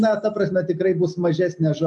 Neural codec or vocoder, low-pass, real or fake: none; 10.8 kHz; real